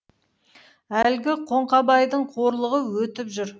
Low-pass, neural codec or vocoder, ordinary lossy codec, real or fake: none; none; none; real